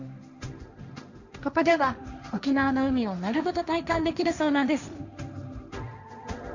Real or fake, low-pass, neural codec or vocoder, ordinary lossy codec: fake; 7.2 kHz; codec, 16 kHz, 1.1 kbps, Voila-Tokenizer; none